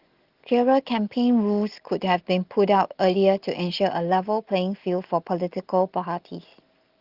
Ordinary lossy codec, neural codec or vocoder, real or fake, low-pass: Opus, 16 kbps; none; real; 5.4 kHz